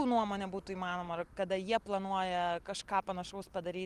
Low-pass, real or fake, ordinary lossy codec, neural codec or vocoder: 14.4 kHz; real; Opus, 24 kbps; none